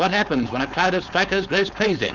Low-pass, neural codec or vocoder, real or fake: 7.2 kHz; codec, 16 kHz, 4.8 kbps, FACodec; fake